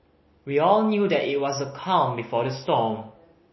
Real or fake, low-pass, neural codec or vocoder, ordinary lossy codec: real; 7.2 kHz; none; MP3, 24 kbps